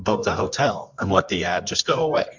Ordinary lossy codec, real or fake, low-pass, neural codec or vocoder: MP3, 64 kbps; fake; 7.2 kHz; codec, 24 kHz, 0.9 kbps, WavTokenizer, medium music audio release